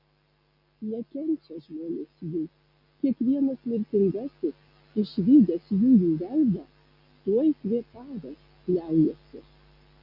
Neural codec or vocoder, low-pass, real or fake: none; 5.4 kHz; real